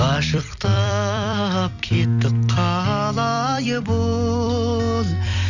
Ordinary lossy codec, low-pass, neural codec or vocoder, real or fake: none; 7.2 kHz; vocoder, 44.1 kHz, 128 mel bands every 256 samples, BigVGAN v2; fake